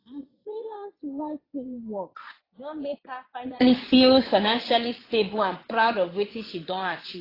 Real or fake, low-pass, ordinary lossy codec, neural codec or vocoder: fake; 5.4 kHz; AAC, 24 kbps; vocoder, 22.05 kHz, 80 mel bands, Vocos